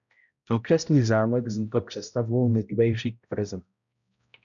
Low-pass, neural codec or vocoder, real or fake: 7.2 kHz; codec, 16 kHz, 0.5 kbps, X-Codec, HuBERT features, trained on balanced general audio; fake